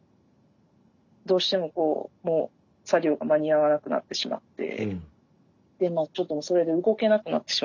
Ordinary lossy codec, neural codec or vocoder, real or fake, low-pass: none; none; real; 7.2 kHz